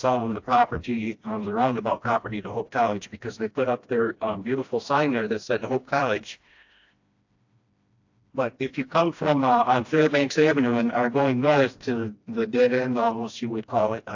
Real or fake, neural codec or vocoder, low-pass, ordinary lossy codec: fake; codec, 16 kHz, 1 kbps, FreqCodec, smaller model; 7.2 kHz; AAC, 48 kbps